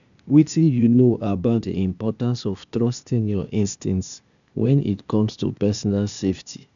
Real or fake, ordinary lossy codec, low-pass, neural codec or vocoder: fake; none; 7.2 kHz; codec, 16 kHz, 0.8 kbps, ZipCodec